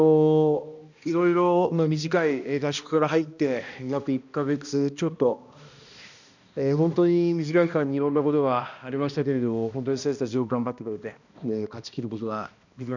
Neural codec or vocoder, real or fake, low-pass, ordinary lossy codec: codec, 16 kHz, 1 kbps, X-Codec, HuBERT features, trained on balanced general audio; fake; 7.2 kHz; none